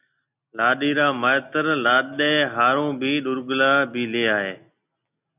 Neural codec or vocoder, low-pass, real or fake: none; 3.6 kHz; real